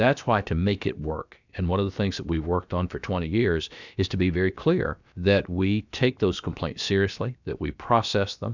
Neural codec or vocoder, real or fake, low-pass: codec, 16 kHz, about 1 kbps, DyCAST, with the encoder's durations; fake; 7.2 kHz